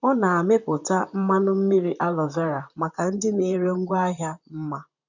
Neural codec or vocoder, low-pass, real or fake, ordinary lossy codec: vocoder, 44.1 kHz, 128 mel bands, Pupu-Vocoder; 7.2 kHz; fake; none